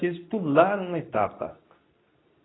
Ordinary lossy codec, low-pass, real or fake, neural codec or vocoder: AAC, 16 kbps; 7.2 kHz; fake; codec, 24 kHz, 0.9 kbps, WavTokenizer, medium speech release version 2